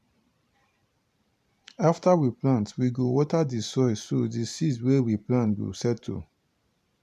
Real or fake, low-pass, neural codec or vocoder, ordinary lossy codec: fake; 14.4 kHz; vocoder, 44.1 kHz, 128 mel bands every 512 samples, BigVGAN v2; MP3, 96 kbps